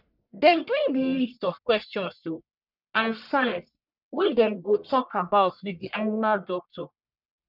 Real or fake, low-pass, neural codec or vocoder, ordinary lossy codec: fake; 5.4 kHz; codec, 44.1 kHz, 1.7 kbps, Pupu-Codec; none